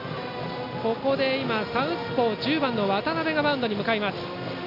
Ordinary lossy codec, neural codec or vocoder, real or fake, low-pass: none; none; real; 5.4 kHz